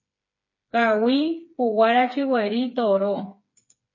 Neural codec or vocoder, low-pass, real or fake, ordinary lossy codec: codec, 16 kHz, 4 kbps, FreqCodec, smaller model; 7.2 kHz; fake; MP3, 32 kbps